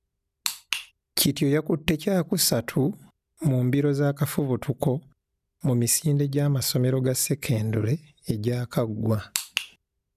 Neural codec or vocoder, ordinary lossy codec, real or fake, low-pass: none; none; real; 14.4 kHz